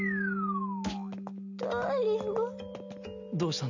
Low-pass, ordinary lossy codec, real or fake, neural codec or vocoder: 7.2 kHz; none; real; none